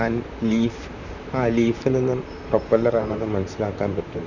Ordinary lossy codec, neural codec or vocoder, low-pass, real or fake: none; vocoder, 44.1 kHz, 128 mel bands, Pupu-Vocoder; 7.2 kHz; fake